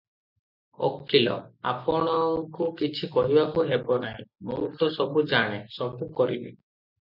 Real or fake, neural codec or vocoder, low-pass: real; none; 5.4 kHz